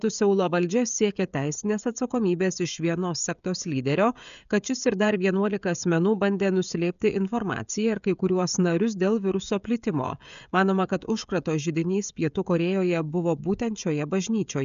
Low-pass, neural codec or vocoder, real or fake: 7.2 kHz; codec, 16 kHz, 16 kbps, FreqCodec, smaller model; fake